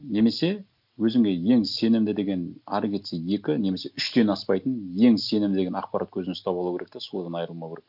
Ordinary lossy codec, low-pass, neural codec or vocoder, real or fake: none; 5.4 kHz; none; real